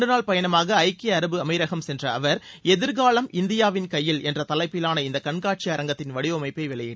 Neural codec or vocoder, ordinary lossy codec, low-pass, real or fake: none; none; none; real